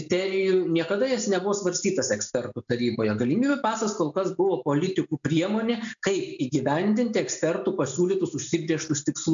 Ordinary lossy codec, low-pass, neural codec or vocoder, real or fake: MP3, 64 kbps; 7.2 kHz; none; real